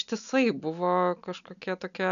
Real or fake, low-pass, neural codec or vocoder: real; 7.2 kHz; none